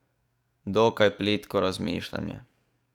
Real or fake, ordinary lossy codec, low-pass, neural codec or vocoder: fake; none; 19.8 kHz; codec, 44.1 kHz, 7.8 kbps, DAC